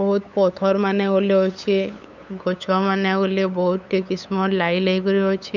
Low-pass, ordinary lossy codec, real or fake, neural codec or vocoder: 7.2 kHz; none; fake; codec, 16 kHz, 8 kbps, FunCodec, trained on LibriTTS, 25 frames a second